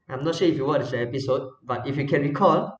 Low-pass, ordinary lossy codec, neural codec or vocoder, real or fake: none; none; none; real